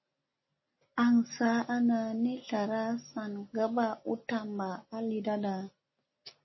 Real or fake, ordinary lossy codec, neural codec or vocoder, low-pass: real; MP3, 24 kbps; none; 7.2 kHz